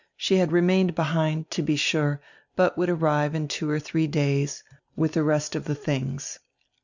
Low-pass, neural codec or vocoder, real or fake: 7.2 kHz; none; real